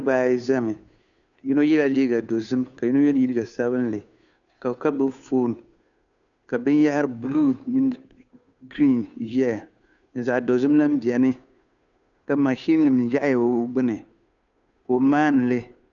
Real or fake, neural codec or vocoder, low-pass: fake; codec, 16 kHz, 2 kbps, FunCodec, trained on Chinese and English, 25 frames a second; 7.2 kHz